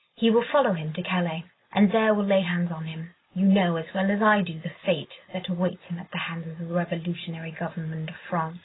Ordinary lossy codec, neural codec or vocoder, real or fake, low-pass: AAC, 16 kbps; none; real; 7.2 kHz